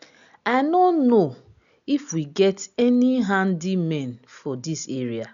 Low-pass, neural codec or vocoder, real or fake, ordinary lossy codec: 7.2 kHz; none; real; none